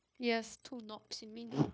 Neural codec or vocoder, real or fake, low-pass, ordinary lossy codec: codec, 16 kHz, 0.9 kbps, LongCat-Audio-Codec; fake; none; none